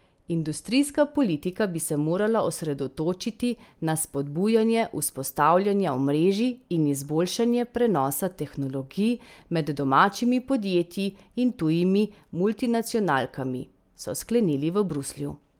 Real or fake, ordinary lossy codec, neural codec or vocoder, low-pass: real; Opus, 32 kbps; none; 19.8 kHz